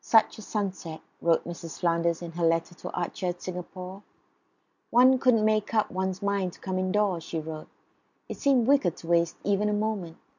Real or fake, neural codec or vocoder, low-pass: real; none; 7.2 kHz